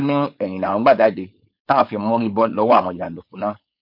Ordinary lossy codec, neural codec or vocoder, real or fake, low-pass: MP3, 48 kbps; codec, 16 kHz, 4.8 kbps, FACodec; fake; 5.4 kHz